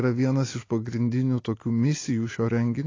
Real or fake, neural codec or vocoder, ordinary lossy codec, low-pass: real; none; AAC, 32 kbps; 7.2 kHz